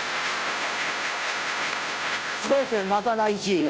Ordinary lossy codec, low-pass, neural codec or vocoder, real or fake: none; none; codec, 16 kHz, 0.5 kbps, FunCodec, trained on Chinese and English, 25 frames a second; fake